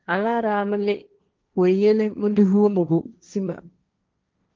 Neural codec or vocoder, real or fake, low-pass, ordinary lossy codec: codec, 16 kHz, 1.1 kbps, Voila-Tokenizer; fake; 7.2 kHz; Opus, 24 kbps